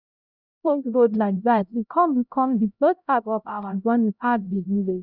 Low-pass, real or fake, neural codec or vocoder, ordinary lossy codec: 5.4 kHz; fake; codec, 16 kHz, 0.5 kbps, X-Codec, HuBERT features, trained on LibriSpeech; none